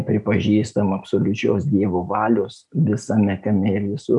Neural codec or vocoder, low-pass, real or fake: none; 10.8 kHz; real